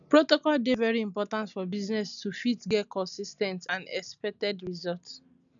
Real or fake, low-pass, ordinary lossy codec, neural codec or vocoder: real; 7.2 kHz; none; none